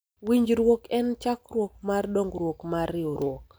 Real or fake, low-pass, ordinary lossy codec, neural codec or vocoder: real; none; none; none